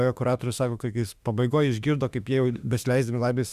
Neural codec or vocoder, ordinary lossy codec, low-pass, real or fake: autoencoder, 48 kHz, 32 numbers a frame, DAC-VAE, trained on Japanese speech; Opus, 64 kbps; 14.4 kHz; fake